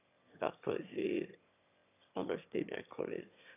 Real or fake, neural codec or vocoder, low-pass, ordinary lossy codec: fake; autoencoder, 22.05 kHz, a latent of 192 numbers a frame, VITS, trained on one speaker; 3.6 kHz; none